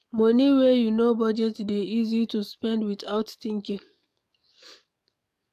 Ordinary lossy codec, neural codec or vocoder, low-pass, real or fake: AAC, 96 kbps; codec, 44.1 kHz, 7.8 kbps, DAC; 14.4 kHz; fake